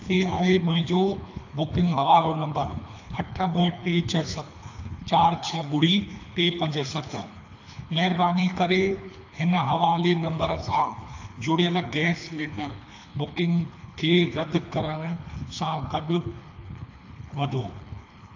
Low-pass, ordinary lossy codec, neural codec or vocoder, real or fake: 7.2 kHz; AAC, 48 kbps; codec, 24 kHz, 3 kbps, HILCodec; fake